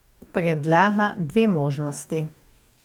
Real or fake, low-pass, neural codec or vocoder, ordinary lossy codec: fake; 19.8 kHz; codec, 44.1 kHz, 2.6 kbps, DAC; none